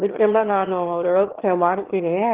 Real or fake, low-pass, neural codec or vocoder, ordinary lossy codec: fake; 3.6 kHz; autoencoder, 22.05 kHz, a latent of 192 numbers a frame, VITS, trained on one speaker; Opus, 16 kbps